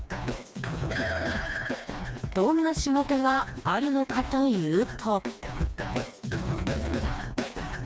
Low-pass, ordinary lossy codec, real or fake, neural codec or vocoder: none; none; fake; codec, 16 kHz, 2 kbps, FreqCodec, smaller model